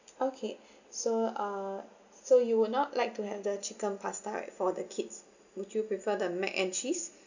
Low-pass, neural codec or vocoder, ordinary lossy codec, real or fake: 7.2 kHz; none; none; real